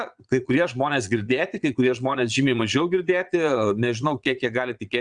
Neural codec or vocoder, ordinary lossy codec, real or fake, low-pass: vocoder, 22.05 kHz, 80 mel bands, Vocos; Opus, 64 kbps; fake; 9.9 kHz